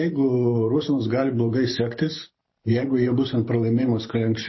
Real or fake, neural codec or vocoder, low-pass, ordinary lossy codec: fake; vocoder, 44.1 kHz, 128 mel bands every 512 samples, BigVGAN v2; 7.2 kHz; MP3, 24 kbps